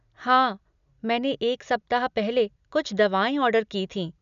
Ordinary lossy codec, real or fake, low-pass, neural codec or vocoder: none; real; 7.2 kHz; none